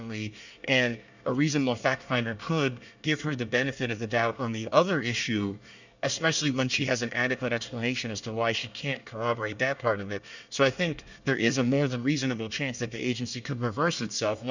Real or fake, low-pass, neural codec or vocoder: fake; 7.2 kHz; codec, 24 kHz, 1 kbps, SNAC